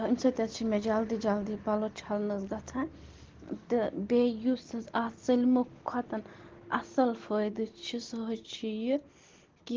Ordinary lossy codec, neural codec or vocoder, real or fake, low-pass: Opus, 16 kbps; none; real; 7.2 kHz